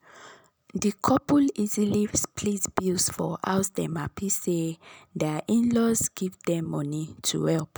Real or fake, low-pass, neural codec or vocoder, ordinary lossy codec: real; none; none; none